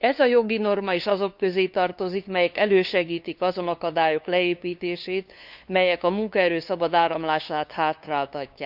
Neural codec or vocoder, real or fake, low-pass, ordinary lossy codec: codec, 16 kHz, 2 kbps, FunCodec, trained on LibriTTS, 25 frames a second; fake; 5.4 kHz; none